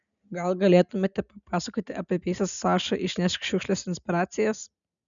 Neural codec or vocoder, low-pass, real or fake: none; 7.2 kHz; real